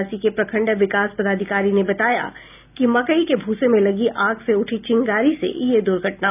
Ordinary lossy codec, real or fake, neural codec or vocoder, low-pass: none; real; none; 3.6 kHz